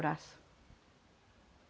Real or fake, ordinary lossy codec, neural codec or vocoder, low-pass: real; none; none; none